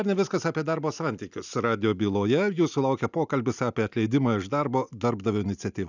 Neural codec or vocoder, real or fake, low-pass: none; real; 7.2 kHz